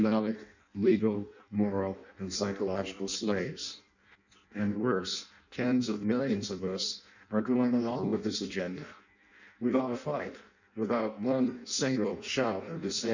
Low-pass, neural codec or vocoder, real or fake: 7.2 kHz; codec, 16 kHz in and 24 kHz out, 0.6 kbps, FireRedTTS-2 codec; fake